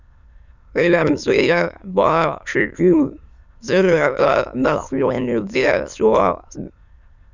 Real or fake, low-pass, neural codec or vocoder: fake; 7.2 kHz; autoencoder, 22.05 kHz, a latent of 192 numbers a frame, VITS, trained on many speakers